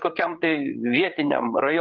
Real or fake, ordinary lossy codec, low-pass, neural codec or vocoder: fake; Opus, 32 kbps; 7.2 kHz; codec, 16 kHz, 8 kbps, FreqCodec, larger model